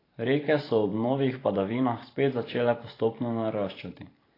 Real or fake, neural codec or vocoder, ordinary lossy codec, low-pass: fake; vocoder, 44.1 kHz, 128 mel bands every 512 samples, BigVGAN v2; AAC, 24 kbps; 5.4 kHz